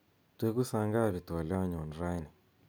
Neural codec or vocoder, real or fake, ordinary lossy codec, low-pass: none; real; none; none